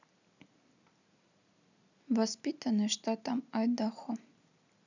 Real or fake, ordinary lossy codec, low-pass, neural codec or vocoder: real; none; 7.2 kHz; none